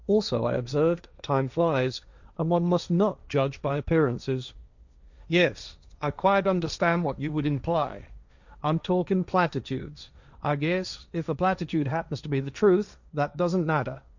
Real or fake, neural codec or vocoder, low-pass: fake; codec, 16 kHz, 1.1 kbps, Voila-Tokenizer; 7.2 kHz